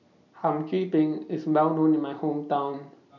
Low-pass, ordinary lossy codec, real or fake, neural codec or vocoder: 7.2 kHz; none; real; none